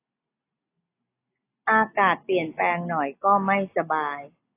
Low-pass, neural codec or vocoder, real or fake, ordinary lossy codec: 3.6 kHz; none; real; AAC, 24 kbps